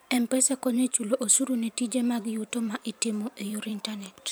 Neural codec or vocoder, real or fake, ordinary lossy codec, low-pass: vocoder, 44.1 kHz, 128 mel bands every 512 samples, BigVGAN v2; fake; none; none